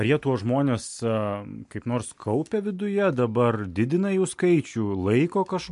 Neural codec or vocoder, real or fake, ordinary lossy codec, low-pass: none; real; MP3, 64 kbps; 10.8 kHz